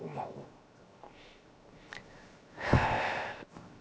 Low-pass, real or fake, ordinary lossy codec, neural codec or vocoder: none; fake; none; codec, 16 kHz, 0.7 kbps, FocalCodec